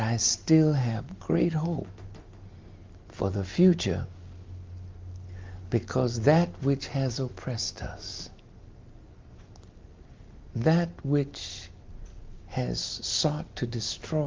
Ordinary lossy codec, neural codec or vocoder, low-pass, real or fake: Opus, 24 kbps; none; 7.2 kHz; real